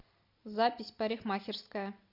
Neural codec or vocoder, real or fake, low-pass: none; real; 5.4 kHz